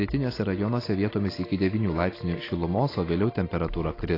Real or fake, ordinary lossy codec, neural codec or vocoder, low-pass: real; AAC, 24 kbps; none; 5.4 kHz